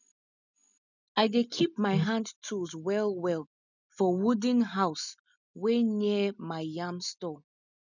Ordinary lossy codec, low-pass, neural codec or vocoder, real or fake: none; 7.2 kHz; none; real